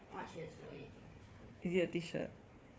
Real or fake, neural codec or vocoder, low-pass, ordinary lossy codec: fake; codec, 16 kHz, 4 kbps, FunCodec, trained on Chinese and English, 50 frames a second; none; none